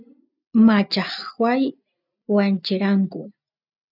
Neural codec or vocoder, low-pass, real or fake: none; 5.4 kHz; real